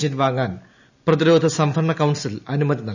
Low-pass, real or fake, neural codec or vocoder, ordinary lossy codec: 7.2 kHz; real; none; none